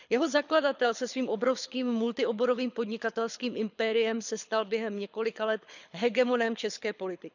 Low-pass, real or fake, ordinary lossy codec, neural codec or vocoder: 7.2 kHz; fake; none; codec, 24 kHz, 6 kbps, HILCodec